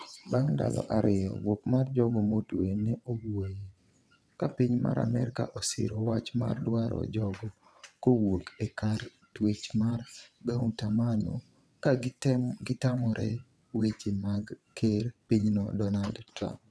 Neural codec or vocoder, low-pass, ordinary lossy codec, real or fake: vocoder, 22.05 kHz, 80 mel bands, WaveNeXt; none; none; fake